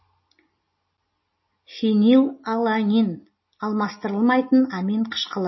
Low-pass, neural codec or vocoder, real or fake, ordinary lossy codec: 7.2 kHz; none; real; MP3, 24 kbps